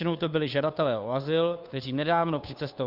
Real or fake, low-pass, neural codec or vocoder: fake; 5.4 kHz; codec, 16 kHz, 2 kbps, FunCodec, trained on Chinese and English, 25 frames a second